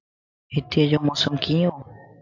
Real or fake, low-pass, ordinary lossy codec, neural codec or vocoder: real; 7.2 kHz; AAC, 48 kbps; none